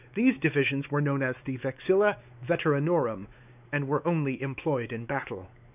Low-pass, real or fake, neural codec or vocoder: 3.6 kHz; fake; codec, 16 kHz, 4 kbps, X-Codec, WavLM features, trained on Multilingual LibriSpeech